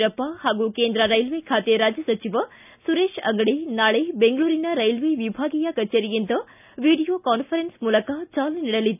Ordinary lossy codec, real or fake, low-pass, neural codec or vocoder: none; real; 3.6 kHz; none